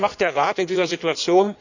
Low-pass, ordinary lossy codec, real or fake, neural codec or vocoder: 7.2 kHz; none; fake; codec, 16 kHz in and 24 kHz out, 1.1 kbps, FireRedTTS-2 codec